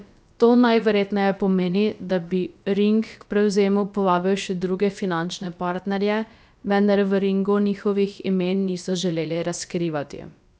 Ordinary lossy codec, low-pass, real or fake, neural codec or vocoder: none; none; fake; codec, 16 kHz, about 1 kbps, DyCAST, with the encoder's durations